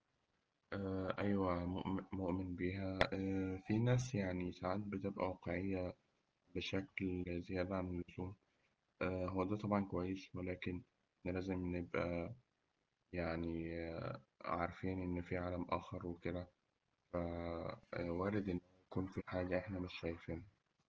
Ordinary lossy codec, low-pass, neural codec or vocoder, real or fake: Opus, 16 kbps; 7.2 kHz; none; real